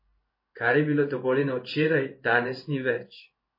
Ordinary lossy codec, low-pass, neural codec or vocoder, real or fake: MP3, 24 kbps; 5.4 kHz; codec, 16 kHz in and 24 kHz out, 1 kbps, XY-Tokenizer; fake